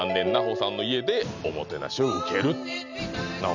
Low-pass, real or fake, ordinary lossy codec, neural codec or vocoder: 7.2 kHz; real; none; none